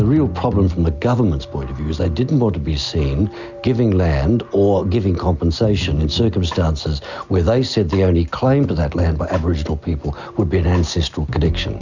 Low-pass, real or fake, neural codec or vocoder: 7.2 kHz; real; none